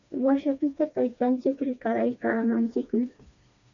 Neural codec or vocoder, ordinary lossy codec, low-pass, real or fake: codec, 16 kHz, 2 kbps, FreqCodec, smaller model; MP3, 96 kbps; 7.2 kHz; fake